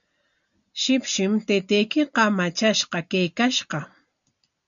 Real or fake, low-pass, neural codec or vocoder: real; 7.2 kHz; none